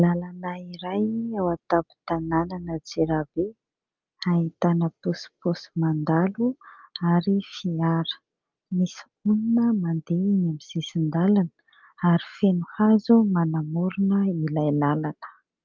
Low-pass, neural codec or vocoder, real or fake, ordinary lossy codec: 7.2 kHz; none; real; Opus, 24 kbps